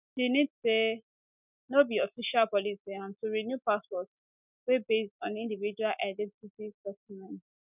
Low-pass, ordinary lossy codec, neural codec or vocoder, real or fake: 3.6 kHz; none; none; real